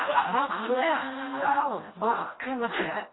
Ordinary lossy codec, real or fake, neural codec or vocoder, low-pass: AAC, 16 kbps; fake; codec, 16 kHz, 1 kbps, FreqCodec, smaller model; 7.2 kHz